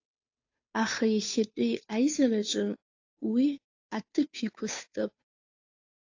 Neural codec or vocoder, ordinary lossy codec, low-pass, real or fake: codec, 16 kHz, 2 kbps, FunCodec, trained on Chinese and English, 25 frames a second; AAC, 48 kbps; 7.2 kHz; fake